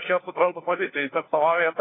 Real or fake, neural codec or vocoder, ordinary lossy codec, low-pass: fake; codec, 16 kHz, 1 kbps, FunCodec, trained on LibriTTS, 50 frames a second; AAC, 16 kbps; 7.2 kHz